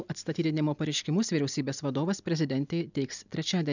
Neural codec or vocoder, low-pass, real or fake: none; 7.2 kHz; real